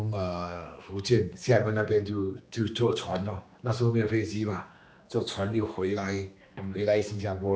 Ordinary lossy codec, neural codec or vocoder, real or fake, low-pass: none; codec, 16 kHz, 2 kbps, X-Codec, HuBERT features, trained on general audio; fake; none